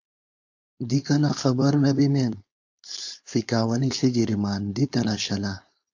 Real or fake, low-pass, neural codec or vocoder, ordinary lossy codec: fake; 7.2 kHz; codec, 16 kHz, 4.8 kbps, FACodec; AAC, 48 kbps